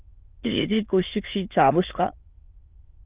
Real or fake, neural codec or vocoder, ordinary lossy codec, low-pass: fake; autoencoder, 22.05 kHz, a latent of 192 numbers a frame, VITS, trained on many speakers; Opus, 24 kbps; 3.6 kHz